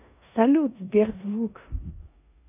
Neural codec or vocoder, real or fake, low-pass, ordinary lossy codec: codec, 16 kHz in and 24 kHz out, 0.9 kbps, LongCat-Audio-Codec, four codebook decoder; fake; 3.6 kHz; none